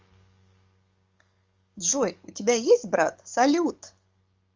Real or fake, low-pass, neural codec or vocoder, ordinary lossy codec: fake; 7.2 kHz; codec, 44.1 kHz, 7.8 kbps, DAC; Opus, 32 kbps